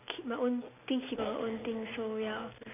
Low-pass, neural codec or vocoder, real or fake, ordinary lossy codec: 3.6 kHz; none; real; none